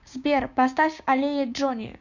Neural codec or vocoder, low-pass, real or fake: codec, 16 kHz, 6 kbps, DAC; 7.2 kHz; fake